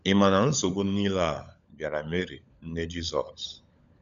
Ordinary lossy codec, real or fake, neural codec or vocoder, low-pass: none; fake; codec, 16 kHz, 16 kbps, FunCodec, trained on LibriTTS, 50 frames a second; 7.2 kHz